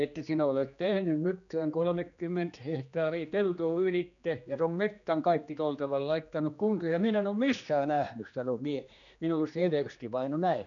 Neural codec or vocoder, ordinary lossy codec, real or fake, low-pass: codec, 16 kHz, 2 kbps, X-Codec, HuBERT features, trained on general audio; none; fake; 7.2 kHz